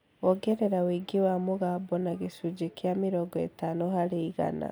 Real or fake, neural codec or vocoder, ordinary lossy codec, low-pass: real; none; none; none